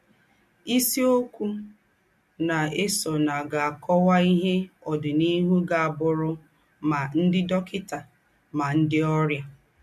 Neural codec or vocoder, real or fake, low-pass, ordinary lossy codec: none; real; 14.4 kHz; MP3, 64 kbps